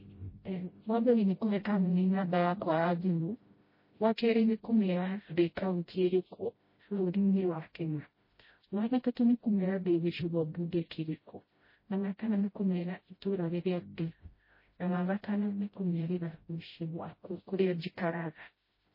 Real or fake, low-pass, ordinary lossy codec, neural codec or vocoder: fake; 5.4 kHz; MP3, 24 kbps; codec, 16 kHz, 0.5 kbps, FreqCodec, smaller model